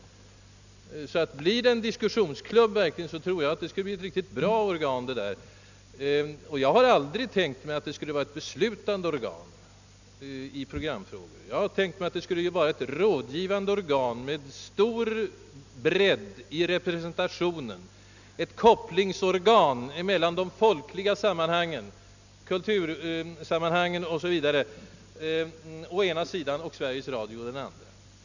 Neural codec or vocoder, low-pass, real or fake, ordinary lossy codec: none; 7.2 kHz; real; none